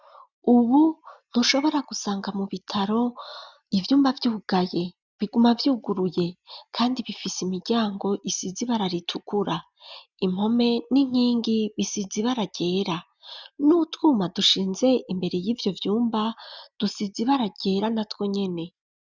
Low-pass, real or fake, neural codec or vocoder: 7.2 kHz; real; none